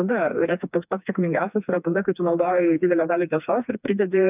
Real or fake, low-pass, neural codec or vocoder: fake; 3.6 kHz; codec, 16 kHz, 2 kbps, FreqCodec, smaller model